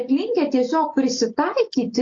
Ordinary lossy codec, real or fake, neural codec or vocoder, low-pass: AAC, 48 kbps; real; none; 7.2 kHz